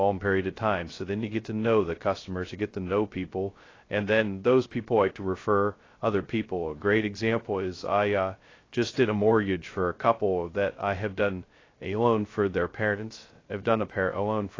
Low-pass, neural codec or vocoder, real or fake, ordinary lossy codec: 7.2 kHz; codec, 16 kHz, 0.2 kbps, FocalCodec; fake; AAC, 32 kbps